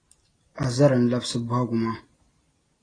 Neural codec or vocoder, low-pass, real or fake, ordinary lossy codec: none; 9.9 kHz; real; AAC, 32 kbps